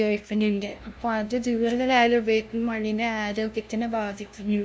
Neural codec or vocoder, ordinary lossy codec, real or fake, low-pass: codec, 16 kHz, 0.5 kbps, FunCodec, trained on LibriTTS, 25 frames a second; none; fake; none